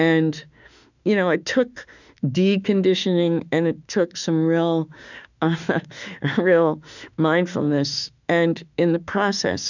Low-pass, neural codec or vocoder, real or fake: 7.2 kHz; autoencoder, 48 kHz, 32 numbers a frame, DAC-VAE, trained on Japanese speech; fake